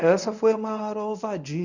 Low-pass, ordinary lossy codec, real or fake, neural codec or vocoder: 7.2 kHz; none; fake; codec, 24 kHz, 0.9 kbps, WavTokenizer, medium speech release version 1